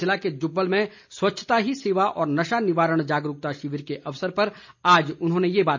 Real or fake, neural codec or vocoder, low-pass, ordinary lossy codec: real; none; 7.2 kHz; MP3, 64 kbps